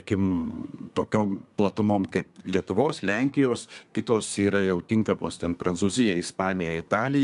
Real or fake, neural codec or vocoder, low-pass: fake; codec, 24 kHz, 1 kbps, SNAC; 10.8 kHz